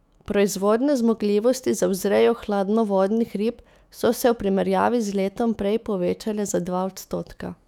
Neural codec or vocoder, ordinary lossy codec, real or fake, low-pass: autoencoder, 48 kHz, 128 numbers a frame, DAC-VAE, trained on Japanese speech; none; fake; 19.8 kHz